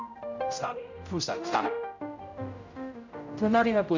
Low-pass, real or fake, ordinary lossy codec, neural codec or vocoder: 7.2 kHz; fake; none; codec, 16 kHz, 0.5 kbps, X-Codec, HuBERT features, trained on general audio